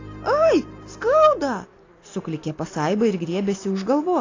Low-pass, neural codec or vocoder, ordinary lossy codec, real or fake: 7.2 kHz; none; AAC, 32 kbps; real